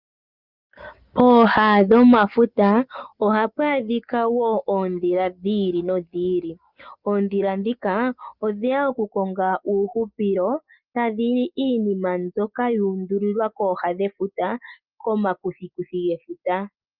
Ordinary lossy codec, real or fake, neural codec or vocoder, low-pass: Opus, 32 kbps; real; none; 5.4 kHz